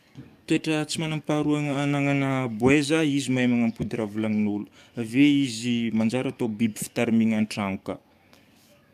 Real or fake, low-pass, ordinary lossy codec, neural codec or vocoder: fake; 14.4 kHz; none; codec, 44.1 kHz, 7.8 kbps, DAC